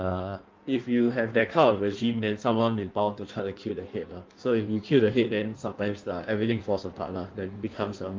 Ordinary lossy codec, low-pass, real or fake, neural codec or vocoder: Opus, 24 kbps; 7.2 kHz; fake; codec, 16 kHz in and 24 kHz out, 1.1 kbps, FireRedTTS-2 codec